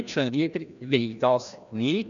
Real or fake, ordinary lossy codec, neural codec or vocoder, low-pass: fake; none; codec, 16 kHz, 1 kbps, FreqCodec, larger model; 7.2 kHz